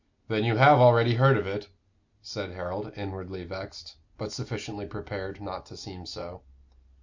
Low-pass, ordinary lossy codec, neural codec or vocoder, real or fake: 7.2 kHz; MP3, 64 kbps; none; real